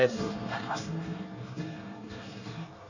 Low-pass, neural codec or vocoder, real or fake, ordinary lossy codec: 7.2 kHz; codec, 24 kHz, 1 kbps, SNAC; fake; none